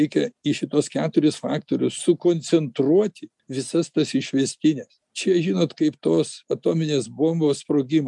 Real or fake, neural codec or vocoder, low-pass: real; none; 10.8 kHz